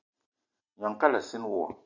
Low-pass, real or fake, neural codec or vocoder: 7.2 kHz; real; none